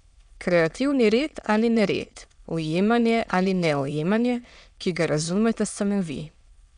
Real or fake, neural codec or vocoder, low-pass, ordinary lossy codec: fake; autoencoder, 22.05 kHz, a latent of 192 numbers a frame, VITS, trained on many speakers; 9.9 kHz; none